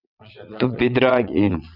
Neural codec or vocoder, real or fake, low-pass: vocoder, 22.05 kHz, 80 mel bands, WaveNeXt; fake; 5.4 kHz